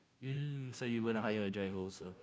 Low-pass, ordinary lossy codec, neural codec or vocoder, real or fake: none; none; codec, 16 kHz, 0.5 kbps, FunCodec, trained on Chinese and English, 25 frames a second; fake